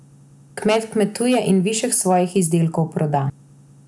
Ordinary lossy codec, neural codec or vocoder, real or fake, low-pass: none; none; real; none